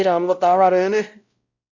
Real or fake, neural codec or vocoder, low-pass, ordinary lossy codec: fake; codec, 16 kHz, 0.5 kbps, X-Codec, WavLM features, trained on Multilingual LibriSpeech; 7.2 kHz; Opus, 64 kbps